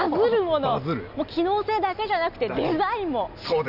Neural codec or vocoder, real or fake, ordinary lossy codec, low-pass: none; real; none; 5.4 kHz